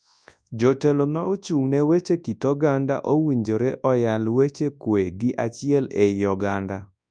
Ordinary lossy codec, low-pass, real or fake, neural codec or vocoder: none; 9.9 kHz; fake; codec, 24 kHz, 0.9 kbps, WavTokenizer, large speech release